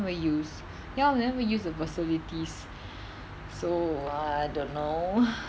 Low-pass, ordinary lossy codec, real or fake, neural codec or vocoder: none; none; real; none